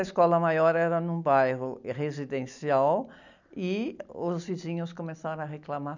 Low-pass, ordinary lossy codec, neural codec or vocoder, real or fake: 7.2 kHz; none; none; real